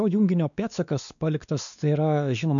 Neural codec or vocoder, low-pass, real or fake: codec, 16 kHz, 2 kbps, X-Codec, WavLM features, trained on Multilingual LibriSpeech; 7.2 kHz; fake